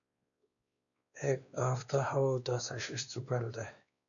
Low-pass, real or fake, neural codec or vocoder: 7.2 kHz; fake; codec, 16 kHz, 2 kbps, X-Codec, WavLM features, trained on Multilingual LibriSpeech